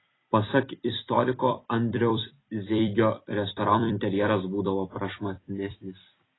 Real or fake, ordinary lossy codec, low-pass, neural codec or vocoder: fake; AAC, 16 kbps; 7.2 kHz; vocoder, 44.1 kHz, 128 mel bands every 256 samples, BigVGAN v2